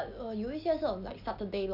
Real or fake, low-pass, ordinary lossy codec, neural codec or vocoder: real; 5.4 kHz; AAC, 48 kbps; none